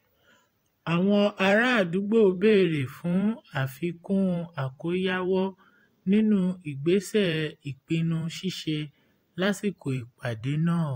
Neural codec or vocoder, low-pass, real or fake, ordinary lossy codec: vocoder, 44.1 kHz, 128 mel bands every 512 samples, BigVGAN v2; 19.8 kHz; fake; AAC, 48 kbps